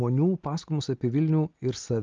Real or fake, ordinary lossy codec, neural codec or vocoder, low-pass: real; Opus, 24 kbps; none; 7.2 kHz